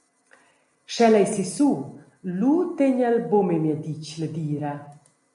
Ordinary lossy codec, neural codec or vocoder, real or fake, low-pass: MP3, 48 kbps; none; real; 14.4 kHz